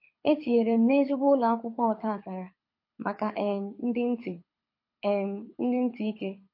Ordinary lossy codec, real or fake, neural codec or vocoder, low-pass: MP3, 32 kbps; fake; codec, 24 kHz, 6 kbps, HILCodec; 5.4 kHz